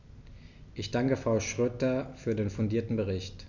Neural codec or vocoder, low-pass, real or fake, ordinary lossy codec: none; 7.2 kHz; real; none